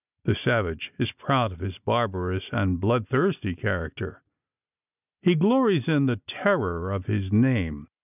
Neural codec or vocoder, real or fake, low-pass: none; real; 3.6 kHz